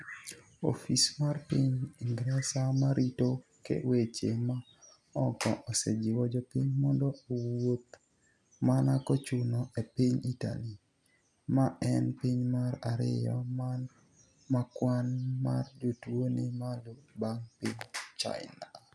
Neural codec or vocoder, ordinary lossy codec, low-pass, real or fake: none; none; none; real